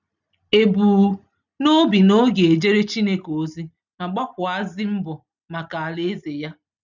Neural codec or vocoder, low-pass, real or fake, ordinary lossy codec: none; 7.2 kHz; real; none